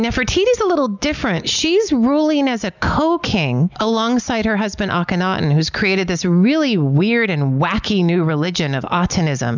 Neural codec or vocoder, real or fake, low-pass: none; real; 7.2 kHz